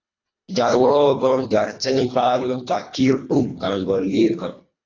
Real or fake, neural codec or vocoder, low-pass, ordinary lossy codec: fake; codec, 24 kHz, 1.5 kbps, HILCodec; 7.2 kHz; AAC, 32 kbps